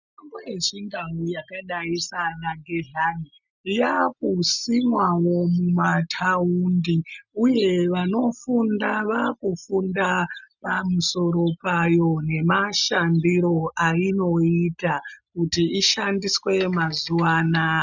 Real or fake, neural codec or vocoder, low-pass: real; none; 7.2 kHz